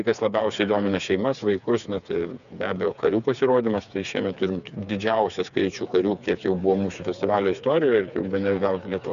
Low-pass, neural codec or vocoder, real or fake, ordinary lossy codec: 7.2 kHz; codec, 16 kHz, 4 kbps, FreqCodec, smaller model; fake; MP3, 96 kbps